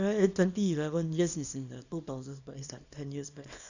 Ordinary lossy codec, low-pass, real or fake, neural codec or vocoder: none; 7.2 kHz; fake; codec, 24 kHz, 0.9 kbps, WavTokenizer, small release